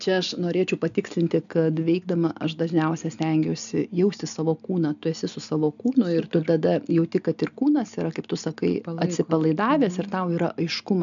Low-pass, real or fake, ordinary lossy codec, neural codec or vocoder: 7.2 kHz; real; MP3, 96 kbps; none